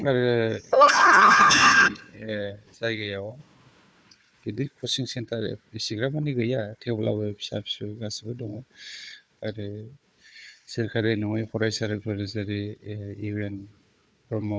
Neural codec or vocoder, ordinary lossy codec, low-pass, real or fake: codec, 16 kHz, 4 kbps, FunCodec, trained on Chinese and English, 50 frames a second; none; none; fake